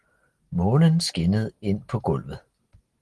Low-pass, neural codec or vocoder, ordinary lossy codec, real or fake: 10.8 kHz; none; Opus, 16 kbps; real